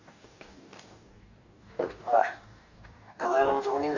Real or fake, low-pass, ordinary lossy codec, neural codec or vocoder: fake; 7.2 kHz; none; codec, 44.1 kHz, 2.6 kbps, DAC